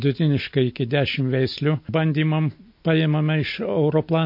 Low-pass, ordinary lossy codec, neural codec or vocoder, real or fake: 5.4 kHz; MP3, 32 kbps; none; real